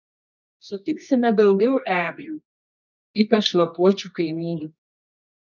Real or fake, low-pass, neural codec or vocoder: fake; 7.2 kHz; codec, 24 kHz, 0.9 kbps, WavTokenizer, medium music audio release